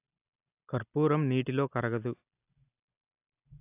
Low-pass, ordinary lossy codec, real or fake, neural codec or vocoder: 3.6 kHz; AAC, 32 kbps; real; none